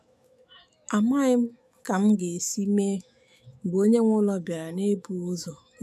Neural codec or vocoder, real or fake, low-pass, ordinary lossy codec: autoencoder, 48 kHz, 128 numbers a frame, DAC-VAE, trained on Japanese speech; fake; 14.4 kHz; none